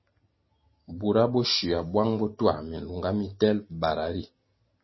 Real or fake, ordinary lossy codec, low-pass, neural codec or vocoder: real; MP3, 24 kbps; 7.2 kHz; none